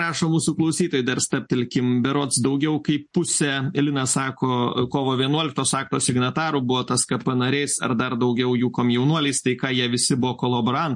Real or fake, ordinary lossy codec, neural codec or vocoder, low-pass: real; MP3, 48 kbps; none; 10.8 kHz